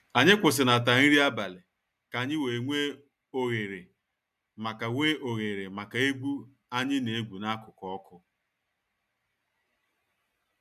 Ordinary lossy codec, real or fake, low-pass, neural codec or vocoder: none; real; 14.4 kHz; none